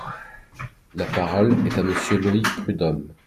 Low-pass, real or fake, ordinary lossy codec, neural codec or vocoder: 14.4 kHz; real; MP3, 96 kbps; none